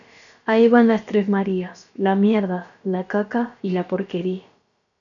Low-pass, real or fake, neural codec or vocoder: 7.2 kHz; fake; codec, 16 kHz, about 1 kbps, DyCAST, with the encoder's durations